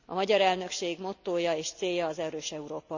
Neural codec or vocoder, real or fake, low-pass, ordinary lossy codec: none; real; 7.2 kHz; none